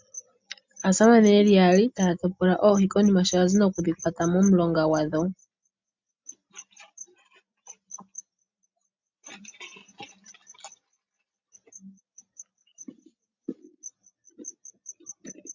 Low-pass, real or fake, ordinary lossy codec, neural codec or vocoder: 7.2 kHz; real; MP3, 64 kbps; none